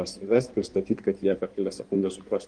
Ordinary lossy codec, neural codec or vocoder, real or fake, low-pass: Opus, 24 kbps; codec, 16 kHz in and 24 kHz out, 1.1 kbps, FireRedTTS-2 codec; fake; 9.9 kHz